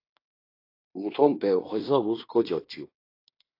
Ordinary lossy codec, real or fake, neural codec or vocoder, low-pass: AAC, 32 kbps; fake; codec, 16 kHz in and 24 kHz out, 0.9 kbps, LongCat-Audio-Codec, fine tuned four codebook decoder; 5.4 kHz